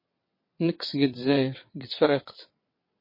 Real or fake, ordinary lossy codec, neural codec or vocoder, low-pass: fake; MP3, 24 kbps; vocoder, 22.05 kHz, 80 mel bands, WaveNeXt; 5.4 kHz